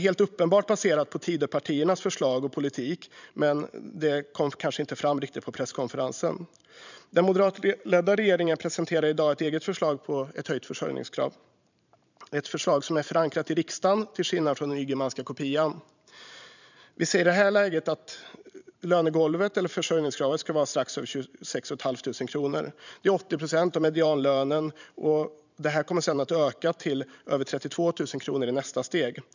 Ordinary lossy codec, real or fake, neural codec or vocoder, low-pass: none; fake; vocoder, 44.1 kHz, 128 mel bands every 512 samples, BigVGAN v2; 7.2 kHz